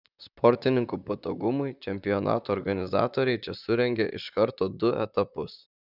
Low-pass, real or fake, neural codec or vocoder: 5.4 kHz; fake; vocoder, 44.1 kHz, 128 mel bands, Pupu-Vocoder